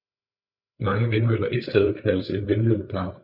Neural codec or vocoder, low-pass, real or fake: codec, 16 kHz, 8 kbps, FreqCodec, larger model; 5.4 kHz; fake